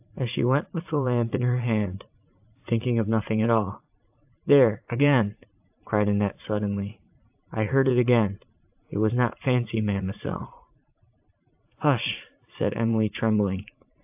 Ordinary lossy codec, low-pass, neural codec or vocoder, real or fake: AAC, 32 kbps; 3.6 kHz; vocoder, 44.1 kHz, 80 mel bands, Vocos; fake